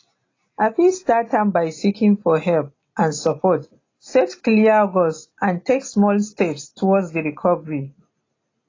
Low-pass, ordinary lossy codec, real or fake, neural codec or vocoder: 7.2 kHz; AAC, 32 kbps; real; none